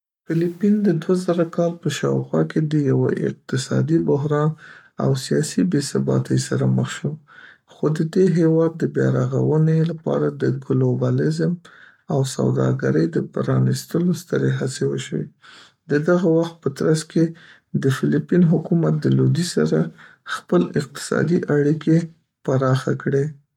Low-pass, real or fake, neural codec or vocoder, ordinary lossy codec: 19.8 kHz; fake; codec, 44.1 kHz, 7.8 kbps, Pupu-Codec; MP3, 96 kbps